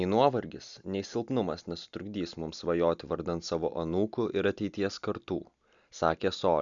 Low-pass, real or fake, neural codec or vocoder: 7.2 kHz; real; none